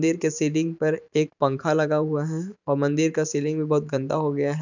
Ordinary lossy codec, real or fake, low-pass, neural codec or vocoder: none; fake; 7.2 kHz; vocoder, 44.1 kHz, 128 mel bands every 512 samples, BigVGAN v2